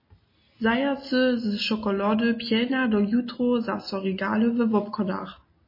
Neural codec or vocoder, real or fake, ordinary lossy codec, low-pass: none; real; MP3, 24 kbps; 5.4 kHz